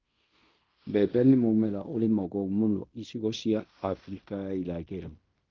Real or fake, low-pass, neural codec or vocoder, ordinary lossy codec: fake; 7.2 kHz; codec, 16 kHz in and 24 kHz out, 0.9 kbps, LongCat-Audio-Codec, fine tuned four codebook decoder; Opus, 32 kbps